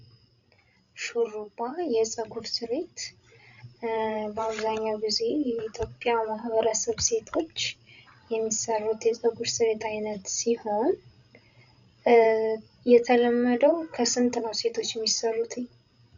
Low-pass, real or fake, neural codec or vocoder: 7.2 kHz; fake; codec, 16 kHz, 16 kbps, FreqCodec, larger model